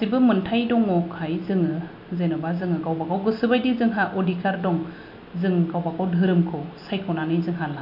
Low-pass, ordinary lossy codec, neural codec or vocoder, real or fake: 5.4 kHz; none; none; real